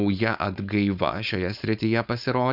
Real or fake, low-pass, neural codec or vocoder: fake; 5.4 kHz; codec, 16 kHz, 4.8 kbps, FACodec